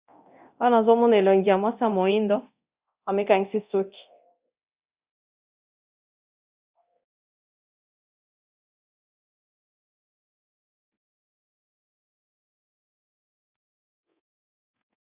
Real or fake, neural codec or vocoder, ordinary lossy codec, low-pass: fake; codec, 24 kHz, 0.9 kbps, DualCodec; Opus, 64 kbps; 3.6 kHz